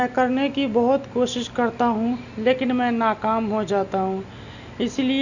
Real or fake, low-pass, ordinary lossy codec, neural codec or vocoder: real; 7.2 kHz; none; none